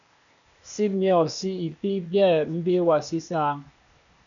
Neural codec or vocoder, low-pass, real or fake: codec, 16 kHz, 0.8 kbps, ZipCodec; 7.2 kHz; fake